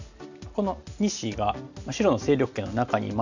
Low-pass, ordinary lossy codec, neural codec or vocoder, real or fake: 7.2 kHz; none; none; real